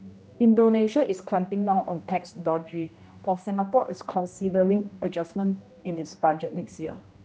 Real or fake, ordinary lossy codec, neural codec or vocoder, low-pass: fake; none; codec, 16 kHz, 1 kbps, X-Codec, HuBERT features, trained on general audio; none